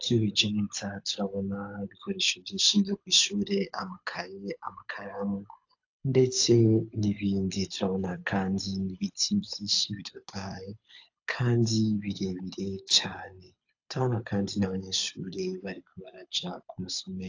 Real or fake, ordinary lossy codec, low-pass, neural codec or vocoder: fake; AAC, 48 kbps; 7.2 kHz; codec, 24 kHz, 6 kbps, HILCodec